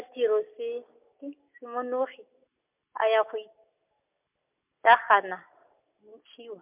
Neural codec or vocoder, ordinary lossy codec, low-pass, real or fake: none; MP3, 32 kbps; 3.6 kHz; real